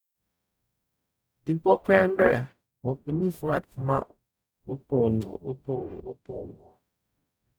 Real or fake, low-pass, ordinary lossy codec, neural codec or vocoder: fake; none; none; codec, 44.1 kHz, 0.9 kbps, DAC